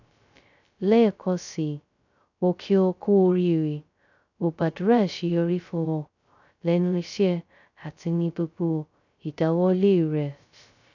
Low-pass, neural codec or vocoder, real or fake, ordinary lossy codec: 7.2 kHz; codec, 16 kHz, 0.2 kbps, FocalCodec; fake; none